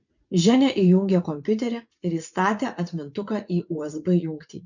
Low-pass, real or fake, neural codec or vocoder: 7.2 kHz; fake; vocoder, 44.1 kHz, 80 mel bands, Vocos